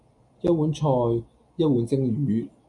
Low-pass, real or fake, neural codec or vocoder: 10.8 kHz; real; none